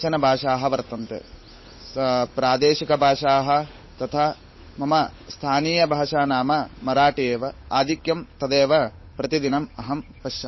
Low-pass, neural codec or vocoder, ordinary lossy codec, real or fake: 7.2 kHz; codec, 16 kHz, 8 kbps, FunCodec, trained on Chinese and English, 25 frames a second; MP3, 24 kbps; fake